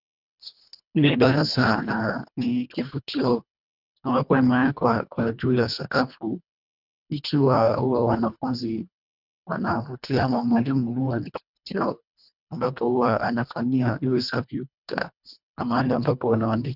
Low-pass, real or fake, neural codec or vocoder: 5.4 kHz; fake; codec, 24 kHz, 1.5 kbps, HILCodec